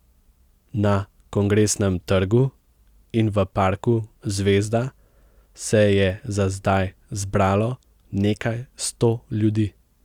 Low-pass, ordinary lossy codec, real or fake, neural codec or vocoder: 19.8 kHz; Opus, 64 kbps; real; none